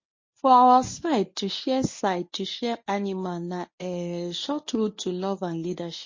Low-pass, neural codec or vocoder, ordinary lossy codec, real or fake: 7.2 kHz; codec, 24 kHz, 0.9 kbps, WavTokenizer, medium speech release version 1; MP3, 32 kbps; fake